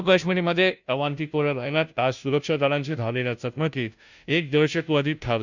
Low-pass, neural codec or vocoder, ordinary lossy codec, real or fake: 7.2 kHz; codec, 16 kHz, 0.5 kbps, FunCodec, trained on Chinese and English, 25 frames a second; none; fake